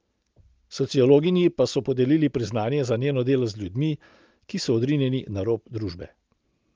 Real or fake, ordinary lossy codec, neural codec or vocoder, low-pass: real; Opus, 24 kbps; none; 7.2 kHz